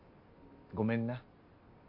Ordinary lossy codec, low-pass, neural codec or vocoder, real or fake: MP3, 32 kbps; 5.4 kHz; none; real